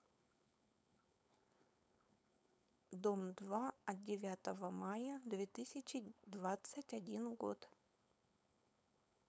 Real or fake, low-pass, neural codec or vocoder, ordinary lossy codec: fake; none; codec, 16 kHz, 4.8 kbps, FACodec; none